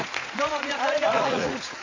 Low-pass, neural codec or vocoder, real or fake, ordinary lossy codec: 7.2 kHz; vocoder, 44.1 kHz, 80 mel bands, Vocos; fake; MP3, 64 kbps